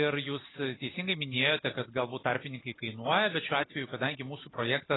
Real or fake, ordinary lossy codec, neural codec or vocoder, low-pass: real; AAC, 16 kbps; none; 7.2 kHz